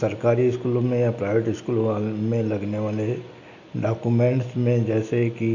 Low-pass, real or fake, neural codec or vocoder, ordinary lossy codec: 7.2 kHz; real; none; AAC, 48 kbps